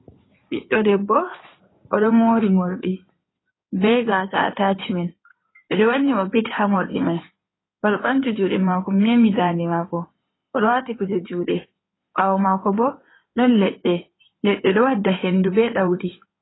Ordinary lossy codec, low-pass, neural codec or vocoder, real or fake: AAC, 16 kbps; 7.2 kHz; codec, 16 kHz in and 24 kHz out, 2.2 kbps, FireRedTTS-2 codec; fake